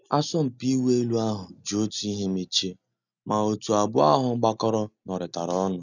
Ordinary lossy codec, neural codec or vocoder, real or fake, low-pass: none; none; real; 7.2 kHz